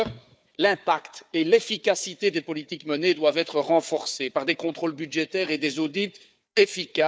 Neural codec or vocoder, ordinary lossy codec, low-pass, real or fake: codec, 16 kHz, 4 kbps, FunCodec, trained on Chinese and English, 50 frames a second; none; none; fake